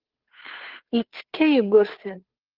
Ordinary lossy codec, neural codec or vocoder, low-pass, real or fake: Opus, 16 kbps; codec, 16 kHz, 2 kbps, FunCodec, trained on Chinese and English, 25 frames a second; 5.4 kHz; fake